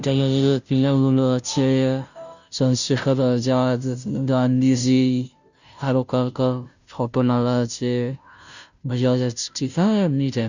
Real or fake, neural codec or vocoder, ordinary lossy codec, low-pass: fake; codec, 16 kHz, 0.5 kbps, FunCodec, trained on Chinese and English, 25 frames a second; none; 7.2 kHz